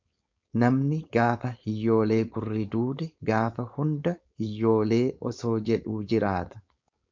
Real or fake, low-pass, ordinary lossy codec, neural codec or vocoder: fake; 7.2 kHz; MP3, 64 kbps; codec, 16 kHz, 4.8 kbps, FACodec